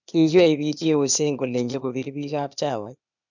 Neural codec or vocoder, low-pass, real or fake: codec, 16 kHz, 0.8 kbps, ZipCodec; 7.2 kHz; fake